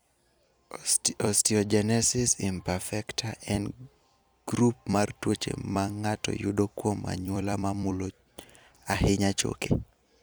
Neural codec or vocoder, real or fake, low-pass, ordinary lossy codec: vocoder, 44.1 kHz, 128 mel bands every 256 samples, BigVGAN v2; fake; none; none